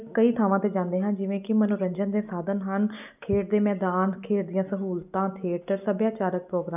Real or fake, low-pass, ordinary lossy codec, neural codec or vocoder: real; 3.6 kHz; none; none